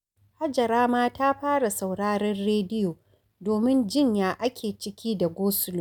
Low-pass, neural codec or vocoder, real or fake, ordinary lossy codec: none; none; real; none